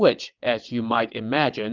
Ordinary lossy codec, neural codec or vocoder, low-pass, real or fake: Opus, 32 kbps; vocoder, 22.05 kHz, 80 mel bands, WaveNeXt; 7.2 kHz; fake